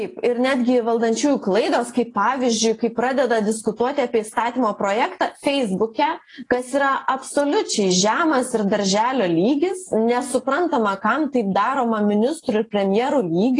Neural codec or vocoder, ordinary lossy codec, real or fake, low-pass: none; AAC, 32 kbps; real; 10.8 kHz